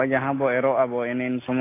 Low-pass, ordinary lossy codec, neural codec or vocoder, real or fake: 3.6 kHz; AAC, 24 kbps; none; real